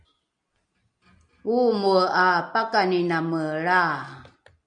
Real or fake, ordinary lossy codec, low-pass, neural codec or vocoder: real; MP3, 96 kbps; 9.9 kHz; none